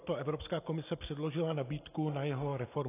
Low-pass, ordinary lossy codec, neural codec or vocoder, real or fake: 3.6 kHz; AAC, 24 kbps; none; real